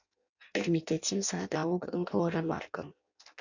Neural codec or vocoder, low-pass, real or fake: codec, 16 kHz in and 24 kHz out, 0.6 kbps, FireRedTTS-2 codec; 7.2 kHz; fake